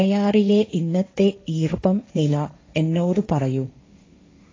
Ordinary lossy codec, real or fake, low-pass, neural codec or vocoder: AAC, 32 kbps; fake; 7.2 kHz; codec, 16 kHz, 1.1 kbps, Voila-Tokenizer